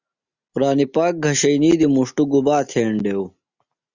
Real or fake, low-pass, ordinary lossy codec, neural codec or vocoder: real; 7.2 kHz; Opus, 64 kbps; none